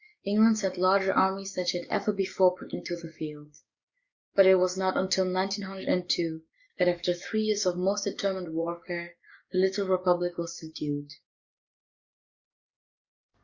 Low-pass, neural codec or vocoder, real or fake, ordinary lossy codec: 7.2 kHz; codec, 44.1 kHz, 7.8 kbps, DAC; fake; Opus, 64 kbps